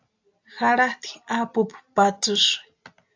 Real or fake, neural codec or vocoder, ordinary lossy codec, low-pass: real; none; AAC, 48 kbps; 7.2 kHz